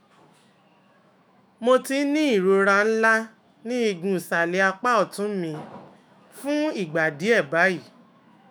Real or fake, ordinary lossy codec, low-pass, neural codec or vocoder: fake; none; none; autoencoder, 48 kHz, 128 numbers a frame, DAC-VAE, trained on Japanese speech